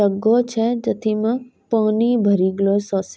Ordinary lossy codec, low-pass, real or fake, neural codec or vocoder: none; none; real; none